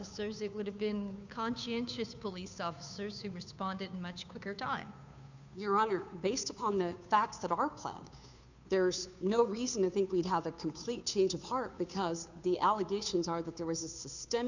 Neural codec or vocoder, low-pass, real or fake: codec, 16 kHz, 2 kbps, FunCodec, trained on Chinese and English, 25 frames a second; 7.2 kHz; fake